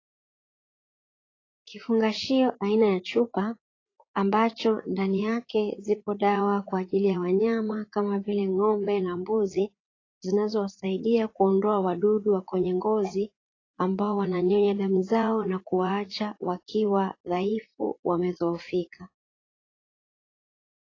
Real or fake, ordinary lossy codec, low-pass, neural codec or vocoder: fake; AAC, 32 kbps; 7.2 kHz; vocoder, 44.1 kHz, 80 mel bands, Vocos